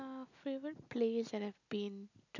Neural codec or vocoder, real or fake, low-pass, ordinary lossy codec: none; real; 7.2 kHz; none